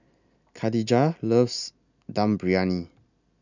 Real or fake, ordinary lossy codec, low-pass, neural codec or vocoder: real; none; 7.2 kHz; none